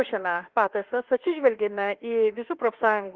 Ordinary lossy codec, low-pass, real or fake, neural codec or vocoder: Opus, 16 kbps; 7.2 kHz; fake; autoencoder, 48 kHz, 32 numbers a frame, DAC-VAE, trained on Japanese speech